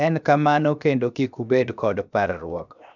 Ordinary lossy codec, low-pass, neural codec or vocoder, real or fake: none; 7.2 kHz; codec, 16 kHz, 0.7 kbps, FocalCodec; fake